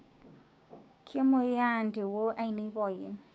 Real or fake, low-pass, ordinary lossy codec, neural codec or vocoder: fake; none; none; codec, 16 kHz, 6 kbps, DAC